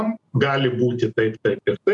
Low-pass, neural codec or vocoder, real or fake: 10.8 kHz; none; real